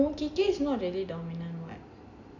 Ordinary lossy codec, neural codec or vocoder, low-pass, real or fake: AAC, 48 kbps; none; 7.2 kHz; real